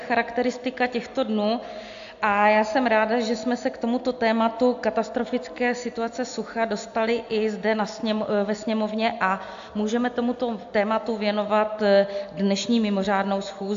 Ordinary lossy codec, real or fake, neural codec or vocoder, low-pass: AAC, 64 kbps; real; none; 7.2 kHz